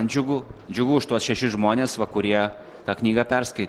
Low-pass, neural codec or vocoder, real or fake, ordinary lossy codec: 19.8 kHz; none; real; Opus, 16 kbps